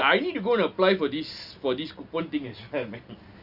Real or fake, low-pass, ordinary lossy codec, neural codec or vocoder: real; 5.4 kHz; Opus, 64 kbps; none